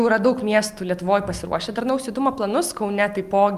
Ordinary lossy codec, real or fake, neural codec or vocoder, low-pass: Opus, 32 kbps; real; none; 14.4 kHz